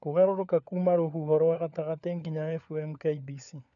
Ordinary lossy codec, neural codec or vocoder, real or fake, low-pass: none; codec, 16 kHz, 4 kbps, FunCodec, trained on LibriTTS, 50 frames a second; fake; 7.2 kHz